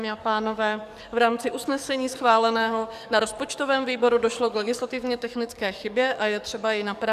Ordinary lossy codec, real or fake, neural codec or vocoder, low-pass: Opus, 64 kbps; fake; codec, 44.1 kHz, 7.8 kbps, DAC; 14.4 kHz